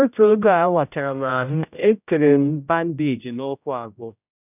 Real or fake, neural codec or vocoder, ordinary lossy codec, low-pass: fake; codec, 16 kHz, 0.5 kbps, X-Codec, HuBERT features, trained on general audio; none; 3.6 kHz